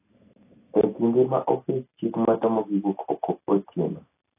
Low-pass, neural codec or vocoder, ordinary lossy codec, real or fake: 3.6 kHz; none; AAC, 24 kbps; real